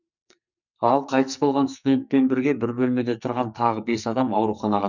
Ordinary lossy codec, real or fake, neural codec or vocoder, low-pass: none; fake; codec, 44.1 kHz, 2.6 kbps, SNAC; 7.2 kHz